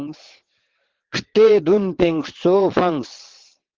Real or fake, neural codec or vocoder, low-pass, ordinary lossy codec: fake; vocoder, 22.05 kHz, 80 mel bands, WaveNeXt; 7.2 kHz; Opus, 16 kbps